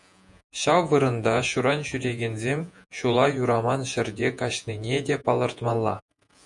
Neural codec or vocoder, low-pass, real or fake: vocoder, 48 kHz, 128 mel bands, Vocos; 10.8 kHz; fake